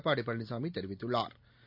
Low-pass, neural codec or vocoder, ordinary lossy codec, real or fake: 5.4 kHz; none; none; real